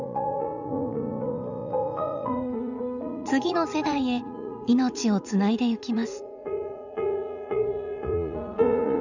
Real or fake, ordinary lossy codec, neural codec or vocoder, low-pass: fake; none; vocoder, 44.1 kHz, 80 mel bands, Vocos; 7.2 kHz